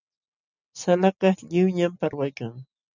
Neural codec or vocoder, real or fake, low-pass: none; real; 7.2 kHz